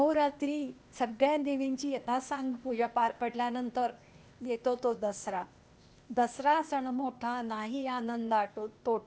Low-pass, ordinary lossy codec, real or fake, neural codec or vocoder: none; none; fake; codec, 16 kHz, 0.8 kbps, ZipCodec